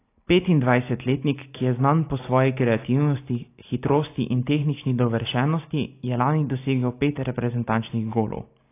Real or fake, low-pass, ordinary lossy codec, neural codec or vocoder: real; 3.6 kHz; AAC, 24 kbps; none